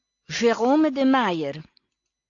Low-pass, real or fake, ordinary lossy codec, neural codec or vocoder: 7.2 kHz; real; AAC, 48 kbps; none